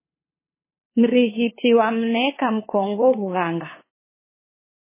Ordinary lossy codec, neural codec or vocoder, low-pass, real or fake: MP3, 16 kbps; codec, 16 kHz, 2 kbps, FunCodec, trained on LibriTTS, 25 frames a second; 3.6 kHz; fake